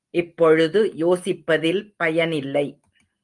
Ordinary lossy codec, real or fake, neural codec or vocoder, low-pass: Opus, 32 kbps; real; none; 10.8 kHz